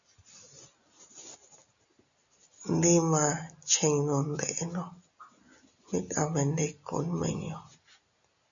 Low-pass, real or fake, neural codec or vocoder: 7.2 kHz; real; none